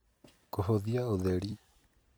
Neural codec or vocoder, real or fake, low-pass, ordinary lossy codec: vocoder, 44.1 kHz, 128 mel bands every 256 samples, BigVGAN v2; fake; none; none